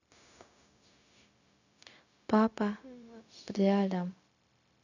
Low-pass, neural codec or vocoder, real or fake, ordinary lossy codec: 7.2 kHz; codec, 16 kHz, 0.4 kbps, LongCat-Audio-Codec; fake; none